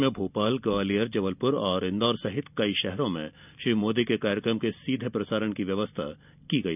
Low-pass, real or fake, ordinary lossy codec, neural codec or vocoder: 3.6 kHz; real; none; none